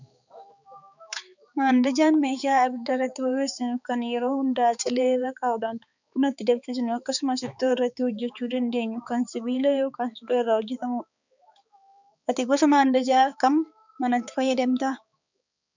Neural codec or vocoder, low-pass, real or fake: codec, 16 kHz, 4 kbps, X-Codec, HuBERT features, trained on balanced general audio; 7.2 kHz; fake